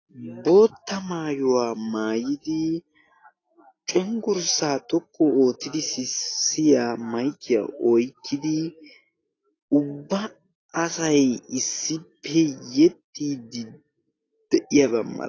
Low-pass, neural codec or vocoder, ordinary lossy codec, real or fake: 7.2 kHz; none; AAC, 32 kbps; real